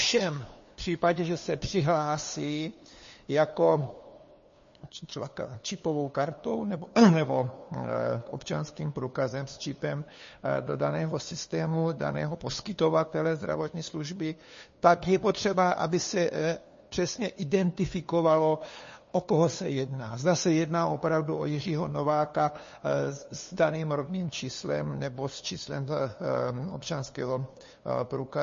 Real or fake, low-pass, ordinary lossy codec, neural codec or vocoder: fake; 7.2 kHz; MP3, 32 kbps; codec, 16 kHz, 2 kbps, FunCodec, trained on LibriTTS, 25 frames a second